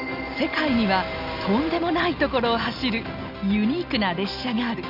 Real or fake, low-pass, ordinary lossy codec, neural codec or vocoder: real; 5.4 kHz; none; none